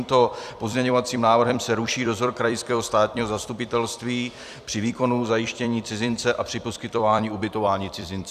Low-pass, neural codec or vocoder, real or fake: 14.4 kHz; vocoder, 44.1 kHz, 128 mel bands every 256 samples, BigVGAN v2; fake